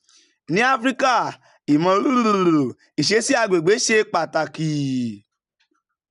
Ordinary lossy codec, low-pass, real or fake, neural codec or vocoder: none; 10.8 kHz; real; none